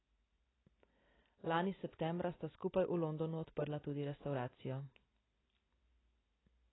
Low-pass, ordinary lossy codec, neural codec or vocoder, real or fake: 7.2 kHz; AAC, 16 kbps; none; real